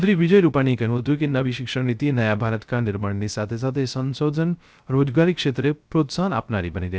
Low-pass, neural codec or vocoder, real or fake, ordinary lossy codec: none; codec, 16 kHz, 0.3 kbps, FocalCodec; fake; none